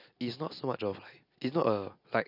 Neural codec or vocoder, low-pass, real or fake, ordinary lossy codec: none; 5.4 kHz; real; none